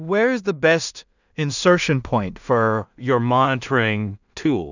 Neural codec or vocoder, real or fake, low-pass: codec, 16 kHz in and 24 kHz out, 0.4 kbps, LongCat-Audio-Codec, two codebook decoder; fake; 7.2 kHz